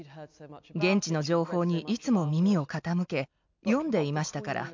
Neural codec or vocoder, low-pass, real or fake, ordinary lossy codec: none; 7.2 kHz; real; MP3, 64 kbps